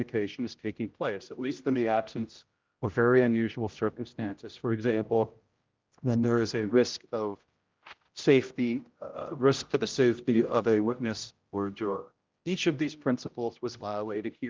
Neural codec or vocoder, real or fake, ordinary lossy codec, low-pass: codec, 16 kHz, 0.5 kbps, X-Codec, HuBERT features, trained on general audio; fake; Opus, 32 kbps; 7.2 kHz